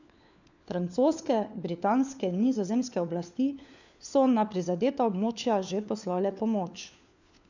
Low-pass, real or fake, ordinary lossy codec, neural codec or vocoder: 7.2 kHz; fake; none; codec, 16 kHz, 4 kbps, FunCodec, trained on LibriTTS, 50 frames a second